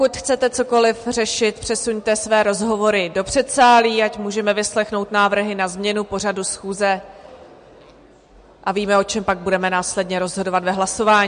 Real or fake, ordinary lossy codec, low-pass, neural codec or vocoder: real; MP3, 48 kbps; 9.9 kHz; none